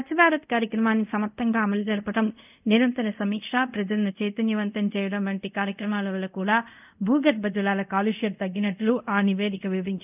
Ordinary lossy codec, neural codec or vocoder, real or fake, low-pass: none; codec, 24 kHz, 0.5 kbps, DualCodec; fake; 3.6 kHz